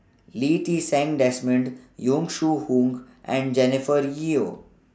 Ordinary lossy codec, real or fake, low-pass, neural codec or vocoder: none; real; none; none